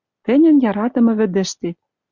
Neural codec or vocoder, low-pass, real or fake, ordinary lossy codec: none; 7.2 kHz; real; Opus, 64 kbps